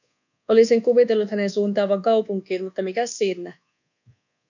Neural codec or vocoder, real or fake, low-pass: codec, 24 kHz, 1.2 kbps, DualCodec; fake; 7.2 kHz